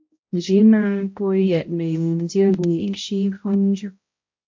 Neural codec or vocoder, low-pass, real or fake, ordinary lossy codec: codec, 16 kHz, 0.5 kbps, X-Codec, HuBERT features, trained on balanced general audio; 7.2 kHz; fake; MP3, 48 kbps